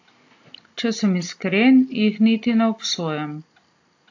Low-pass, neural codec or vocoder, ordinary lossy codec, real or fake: 7.2 kHz; none; AAC, 48 kbps; real